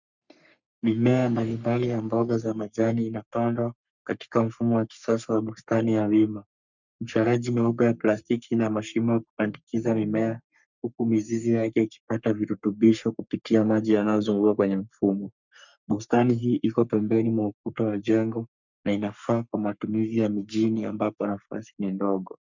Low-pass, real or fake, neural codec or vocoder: 7.2 kHz; fake; codec, 44.1 kHz, 3.4 kbps, Pupu-Codec